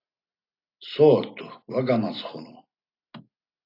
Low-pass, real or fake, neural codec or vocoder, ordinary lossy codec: 5.4 kHz; real; none; AAC, 32 kbps